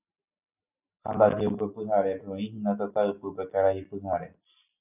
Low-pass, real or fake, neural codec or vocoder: 3.6 kHz; real; none